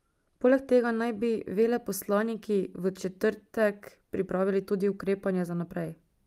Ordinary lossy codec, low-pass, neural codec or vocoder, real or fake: Opus, 32 kbps; 14.4 kHz; none; real